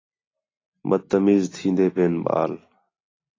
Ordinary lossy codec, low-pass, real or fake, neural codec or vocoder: AAC, 32 kbps; 7.2 kHz; real; none